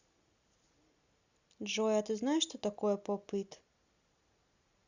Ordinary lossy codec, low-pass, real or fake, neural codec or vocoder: Opus, 64 kbps; 7.2 kHz; real; none